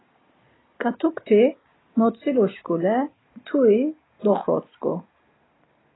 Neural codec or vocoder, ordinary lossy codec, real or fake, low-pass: none; AAC, 16 kbps; real; 7.2 kHz